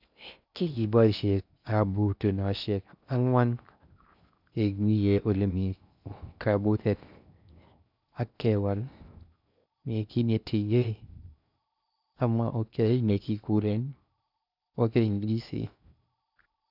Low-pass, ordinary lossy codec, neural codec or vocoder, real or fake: 5.4 kHz; none; codec, 16 kHz in and 24 kHz out, 0.6 kbps, FocalCodec, streaming, 4096 codes; fake